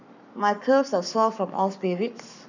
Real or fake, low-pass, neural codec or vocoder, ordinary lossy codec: fake; 7.2 kHz; codec, 44.1 kHz, 7.8 kbps, Pupu-Codec; none